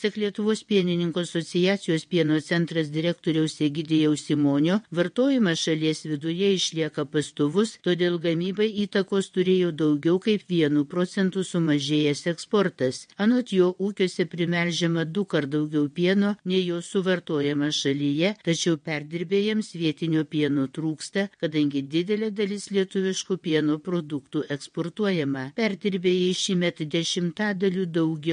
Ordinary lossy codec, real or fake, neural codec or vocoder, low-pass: MP3, 48 kbps; fake; vocoder, 22.05 kHz, 80 mel bands, WaveNeXt; 9.9 kHz